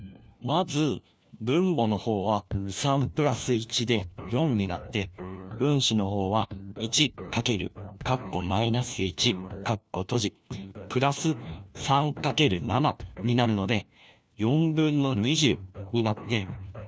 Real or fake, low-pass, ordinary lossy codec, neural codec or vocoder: fake; none; none; codec, 16 kHz, 1 kbps, FunCodec, trained on LibriTTS, 50 frames a second